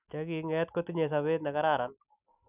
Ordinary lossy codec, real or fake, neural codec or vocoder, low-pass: none; real; none; 3.6 kHz